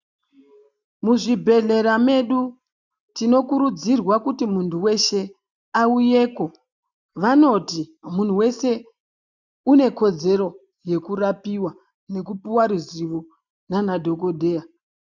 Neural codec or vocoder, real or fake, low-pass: none; real; 7.2 kHz